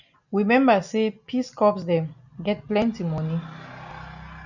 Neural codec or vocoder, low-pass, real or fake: none; 7.2 kHz; real